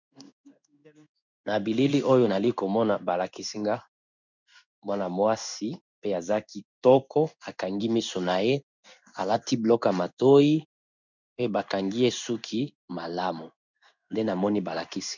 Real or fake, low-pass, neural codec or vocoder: fake; 7.2 kHz; codec, 16 kHz in and 24 kHz out, 1 kbps, XY-Tokenizer